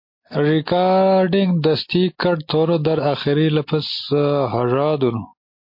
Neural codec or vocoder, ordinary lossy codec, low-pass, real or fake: none; MP3, 32 kbps; 5.4 kHz; real